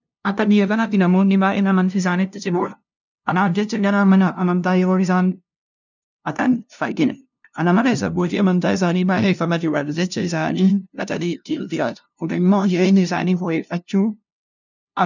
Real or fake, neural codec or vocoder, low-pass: fake; codec, 16 kHz, 0.5 kbps, FunCodec, trained on LibriTTS, 25 frames a second; 7.2 kHz